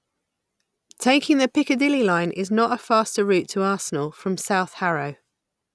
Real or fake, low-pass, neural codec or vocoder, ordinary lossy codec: real; none; none; none